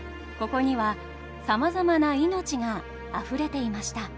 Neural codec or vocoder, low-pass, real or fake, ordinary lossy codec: none; none; real; none